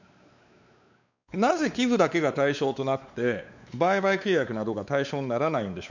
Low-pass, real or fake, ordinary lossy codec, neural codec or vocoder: 7.2 kHz; fake; none; codec, 16 kHz, 4 kbps, X-Codec, WavLM features, trained on Multilingual LibriSpeech